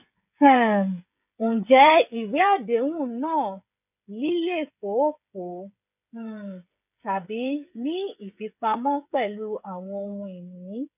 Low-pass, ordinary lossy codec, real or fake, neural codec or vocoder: 3.6 kHz; none; fake; codec, 16 kHz, 8 kbps, FreqCodec, smaller model